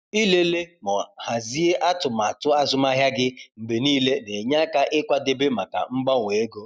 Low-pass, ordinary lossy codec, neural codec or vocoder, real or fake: none; none; none; real